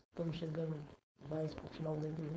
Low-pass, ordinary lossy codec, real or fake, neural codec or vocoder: none; none; fake; codec, 16 kHz, 4.8 kbps, FACodec